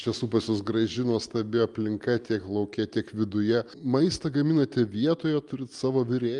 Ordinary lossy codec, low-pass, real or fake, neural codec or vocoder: Opus, 32 kbps; 10.8 kHz; real; none